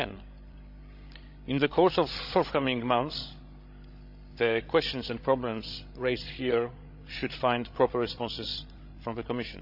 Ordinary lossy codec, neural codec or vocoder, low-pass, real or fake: AAC, 48 kbps; codec, 16 kHz, 16 kbps, FreqCodec, larger model; 5.4 kHz; fake